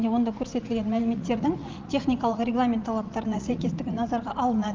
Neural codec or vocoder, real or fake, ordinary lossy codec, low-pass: vocoder, 44.1 kHz, 80 mel bands, Vocos; fake; Opus, 24 kbps; 7.2 kHz